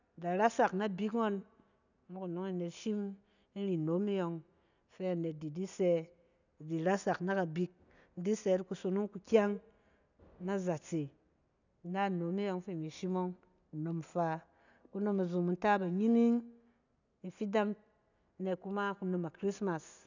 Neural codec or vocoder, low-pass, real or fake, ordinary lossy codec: none; 7.2 kHz; real; none